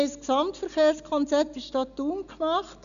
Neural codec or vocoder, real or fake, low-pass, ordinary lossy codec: none; real; 7.2 kHz; none